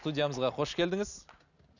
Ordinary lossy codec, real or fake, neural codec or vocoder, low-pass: none; real; none; 7.2 kHz